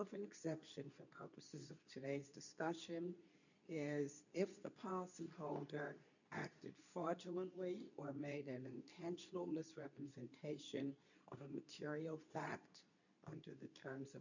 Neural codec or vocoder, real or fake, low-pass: codec, 24 kHz, 0.9 kbps, WavTokenizer, medium speech release version 2; fake; 7.2 kHz